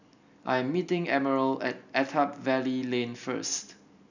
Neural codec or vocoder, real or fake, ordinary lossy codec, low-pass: none; real; none; 7.2 kHz